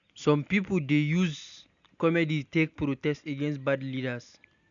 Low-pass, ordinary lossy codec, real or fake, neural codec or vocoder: 7.2 kHz; none; real; none